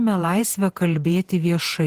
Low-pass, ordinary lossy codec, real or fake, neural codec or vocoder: 14.4 kHz; Opus, 16 kbps; fake; vocoder, 48 kHz, 128 mel bands, Vocos